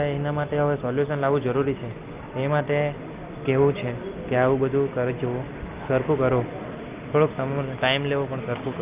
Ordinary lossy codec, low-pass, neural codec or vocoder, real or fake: Opus, 24 kbps; 3.6 kHz; none; real